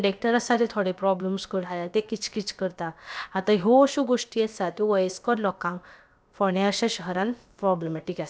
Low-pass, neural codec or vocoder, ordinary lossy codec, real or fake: none; codec, 16 kHz, about 1 kbps, DyCAST, with the encoder's durations; none; fake